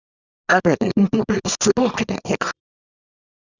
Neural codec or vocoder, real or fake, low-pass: codec, 24 kHz, 0.9 kbps, WavTokenizer, small release; fake; 7.2 kHz